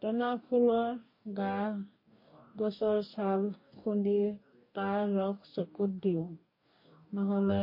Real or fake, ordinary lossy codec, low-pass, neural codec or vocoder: fake; MP3, 32 kbps; 5.4 kHz; codec, 44.1 kHz, 2.6 kbps, DAC